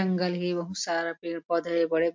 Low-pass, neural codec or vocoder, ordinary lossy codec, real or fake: 7.2 kHz; none; MP3, 48 kbps; real